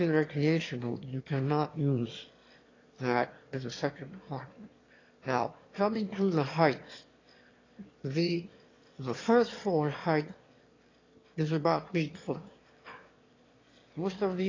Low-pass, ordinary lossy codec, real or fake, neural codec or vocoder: 7.2 kHz; AAC, 32 kbps; fake; autoencoder, 22.05 kHz, a latent of 192 numbers a frame, VITS, trained on one speaker